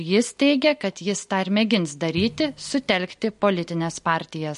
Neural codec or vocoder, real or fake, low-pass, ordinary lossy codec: none; real; 14.4 kHz; MP3, 48 kbps